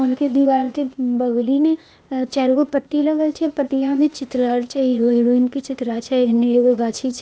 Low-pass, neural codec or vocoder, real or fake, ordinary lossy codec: none; codec, 16 kHz, 0.8 kbps, ZipCodec; fake; none